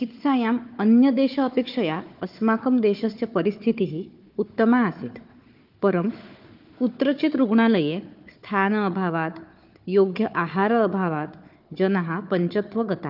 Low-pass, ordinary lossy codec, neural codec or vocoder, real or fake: 5.4 kHz; Opus, 24 kbps; codec, 16 kHz, 4 kbps, FunCodec, trained on Chinese and English, 50 frames a second; fake